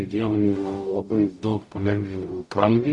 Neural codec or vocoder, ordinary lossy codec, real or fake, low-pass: codec, 44.1 kHz, 0.9 kbps, DAC; Opus, 64 kbps; fake; 10.8 kHz